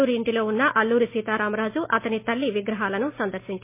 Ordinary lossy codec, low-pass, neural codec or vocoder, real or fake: MP3, 24 kbps; 3.6 kHz; none; real